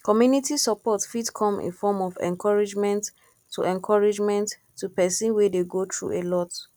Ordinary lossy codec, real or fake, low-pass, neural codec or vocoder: none; real; 19.8 kHz; none